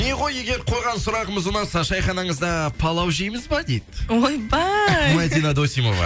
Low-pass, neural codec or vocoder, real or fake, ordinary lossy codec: none; none; real; none